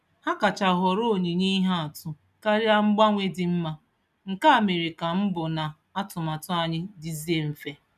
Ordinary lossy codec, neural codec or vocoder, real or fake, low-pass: none; none; real; 14.4 kHz